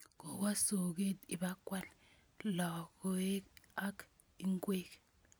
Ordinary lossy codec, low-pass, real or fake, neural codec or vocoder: none; none; real; none